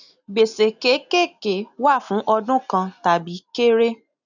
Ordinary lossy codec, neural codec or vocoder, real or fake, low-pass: none; none; real; 7.2 kHz